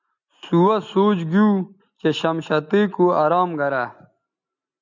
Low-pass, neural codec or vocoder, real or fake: 7.2 kHz; none; real